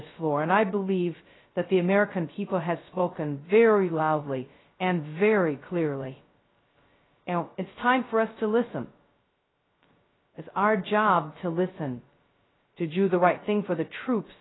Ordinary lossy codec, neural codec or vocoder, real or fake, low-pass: AAC, 16 kbps; codec, 16 kHz, 0.2 kbps, FocalCodec; fake; 7.2 kHz